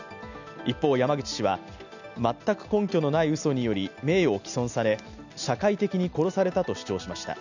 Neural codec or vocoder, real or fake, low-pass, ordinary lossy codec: none; real; 7.2 kHz; none